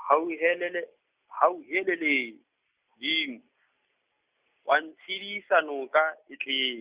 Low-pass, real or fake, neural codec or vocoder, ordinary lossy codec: 3.6 kHz; real; none; Opus, 64 kbps